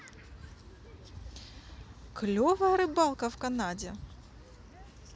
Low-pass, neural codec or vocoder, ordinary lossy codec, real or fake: none; none; none; real